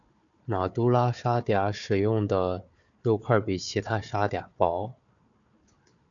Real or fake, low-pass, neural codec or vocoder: fake; 7.2 kHz; codec, 16 kHz, 4 kbps, FunCodec, trained on Chinese and English, 50 frames a second